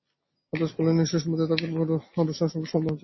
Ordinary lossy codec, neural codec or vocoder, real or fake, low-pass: MP3, 24 kbps; none; real; 7.2 kHz